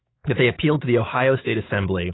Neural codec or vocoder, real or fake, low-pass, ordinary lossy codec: autoencoder, 48 kHz, 128 numbers a frame, DAC-VAE, trained on Japanese speech; fake; 7.2 kHz; AAC, 16 kbps